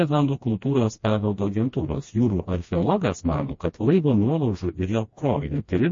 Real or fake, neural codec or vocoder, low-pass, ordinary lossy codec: fake; codec, 16 kHz, 1 kbps, FreqCodec, smaller model; 7.2 kHz; MP3, 32 kbps